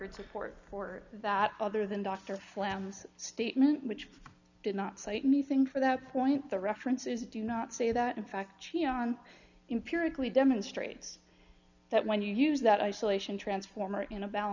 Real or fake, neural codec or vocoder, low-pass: real; none; 7.2 kHz